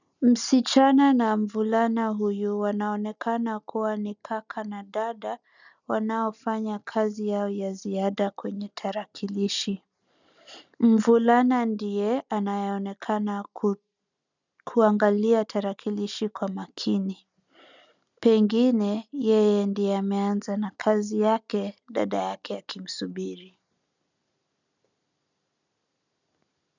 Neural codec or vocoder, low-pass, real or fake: none; 7.2 kHz; real